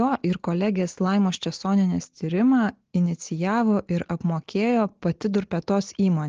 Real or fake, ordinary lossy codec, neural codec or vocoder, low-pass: real; Opus, 16 kbps; none; 7.2 kHz